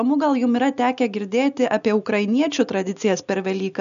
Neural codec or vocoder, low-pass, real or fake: none; 7.2 kHz; real